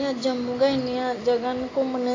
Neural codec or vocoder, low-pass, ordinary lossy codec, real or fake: none; 7.2 kHz; AAC, 32 kbps; real